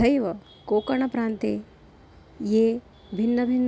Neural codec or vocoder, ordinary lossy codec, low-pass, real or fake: none; none; none; real